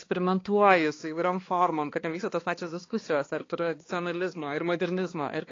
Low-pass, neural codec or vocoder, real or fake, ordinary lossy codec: 7.2 kHz; codec, 16 kHz, 2 kbps, X-Codec, HuBERT features, trained on balanced general audio; fake; AAC, 32 kbps